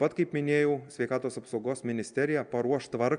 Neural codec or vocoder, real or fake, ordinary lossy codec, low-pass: none; real; MP3, 96 kbps; 9.9 kHz